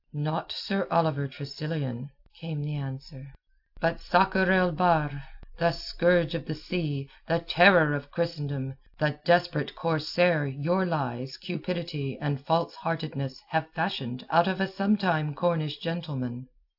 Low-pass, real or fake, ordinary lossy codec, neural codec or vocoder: 5.4 kHz; real; AAC, 48 kbps; none